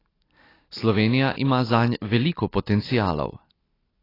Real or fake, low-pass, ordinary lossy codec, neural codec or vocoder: real; 5.4 kHz; AAC, 24 kbps; none